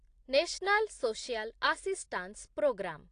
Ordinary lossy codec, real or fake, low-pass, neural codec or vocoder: AAC, 48 kbps; real; 9.9 kHz; none